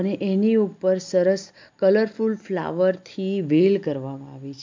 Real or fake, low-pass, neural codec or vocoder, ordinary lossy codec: real; 7.2 kHz; none; MP3, 64 kbps